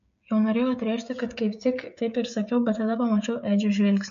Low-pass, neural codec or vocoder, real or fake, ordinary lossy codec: 7.2 kHz; codec, 16 kHz, 16 kbps, FreqCodec, smaller model; fake; MP3, 48 kbps